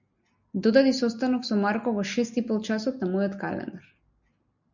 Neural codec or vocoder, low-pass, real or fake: none; 7.2 kHz; real